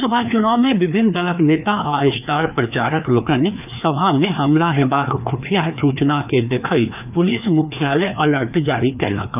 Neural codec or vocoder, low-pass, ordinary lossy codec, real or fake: codec, 16 kHz, 2 kbps, FreqCodec, larger model; 3.6 kHz; none; fake